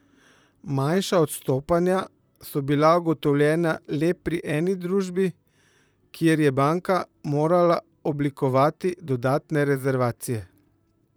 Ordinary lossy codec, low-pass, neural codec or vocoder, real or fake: none; none; vocoder, 44.1 kHz, 128 mel bands, Pupu-Vocoder; fake